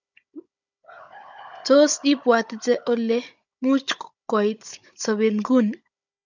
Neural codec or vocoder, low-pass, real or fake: codec, 16 kHz, 16 kbps, FunCodec, trained on Chinese and English, 50 frames a second; 7.2 kHz; fake